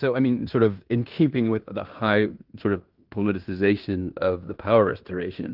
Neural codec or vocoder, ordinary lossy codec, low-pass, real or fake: codec, 16 kHz in and 24 kHz out, 0.9 kbps, LongCat-Audio-Codec, fine tuned four codebook decoder; Opus, 32 kbps; 5.4 kHz; fake